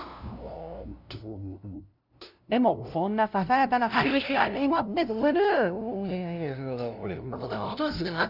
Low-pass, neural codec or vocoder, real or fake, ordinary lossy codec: 5.4 kHz; codec, 16 kHz, 0.5 kbps, FunCodec, trained on LibriTTS, 25 frames a second; fake; none